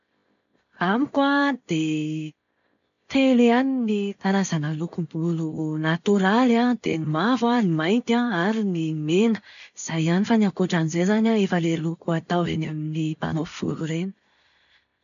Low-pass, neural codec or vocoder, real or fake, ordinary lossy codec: 7.2 kHz; none; real; none